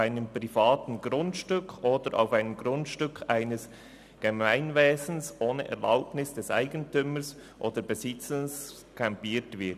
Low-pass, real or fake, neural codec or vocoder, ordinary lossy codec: 14.4 kHz; real; none; none